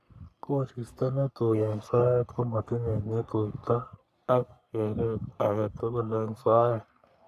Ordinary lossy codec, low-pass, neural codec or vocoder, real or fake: none; 14.4 kHz; codec, 44.1 kHz, 3.4 kbps, Pupu-Codec; fake